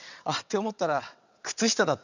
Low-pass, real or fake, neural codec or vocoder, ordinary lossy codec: 7.2 kHz; fake; vocoder, 22.05 kHz, 80 mel bands, WaveNeXt; none